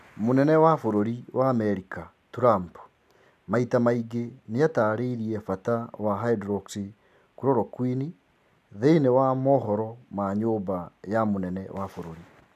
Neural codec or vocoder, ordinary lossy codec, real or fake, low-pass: none; none; real; 14.4 kHz